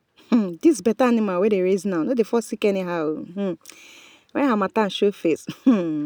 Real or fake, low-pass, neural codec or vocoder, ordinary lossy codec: real; none; none; none